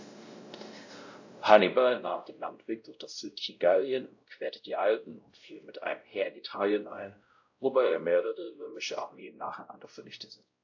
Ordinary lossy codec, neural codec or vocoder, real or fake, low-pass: AAC, 48 kbps; codec, 16 kHz, 0.5 kbps, X-Codec, WavLM features, trained on Multilingual LibriSpeech; fake; 7.2 kHz